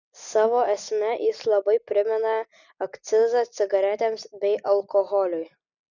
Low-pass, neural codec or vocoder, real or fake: 7.2 kHz; none; real